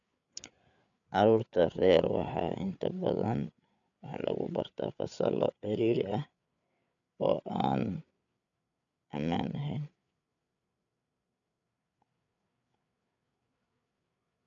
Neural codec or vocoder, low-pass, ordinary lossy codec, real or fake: codec, 16 kHz, 8 kbps, FreqCodec, larger model; 7.2 kHz; none; fake